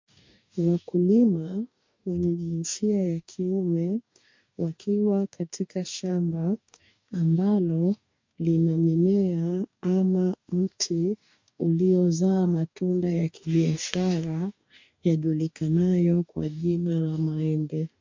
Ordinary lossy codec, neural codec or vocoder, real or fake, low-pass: MP3, 48 kbps; codec, 44.1 kHz, 2.6 kbps, DAC; fake; 7.2 kHz